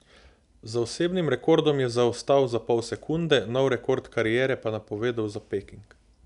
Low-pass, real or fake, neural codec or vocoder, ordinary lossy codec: 10.8 kHz; real; none; none